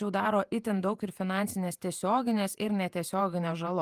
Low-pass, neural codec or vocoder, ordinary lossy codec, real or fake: 14.4 kHz; vocoder, 48 kHz, 128 mel bands, Vocos; Opus, 32 kbps; fake